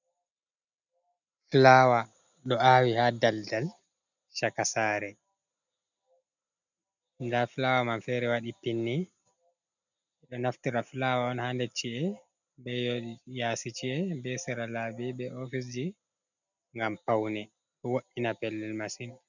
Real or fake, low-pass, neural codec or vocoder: real; 7.2 kHz; none